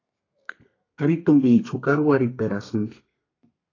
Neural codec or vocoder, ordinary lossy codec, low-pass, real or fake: codec, 32 kHz, 1.9 kbps, SNAC; AAC, 32 kbps; 7.2 kHz; fake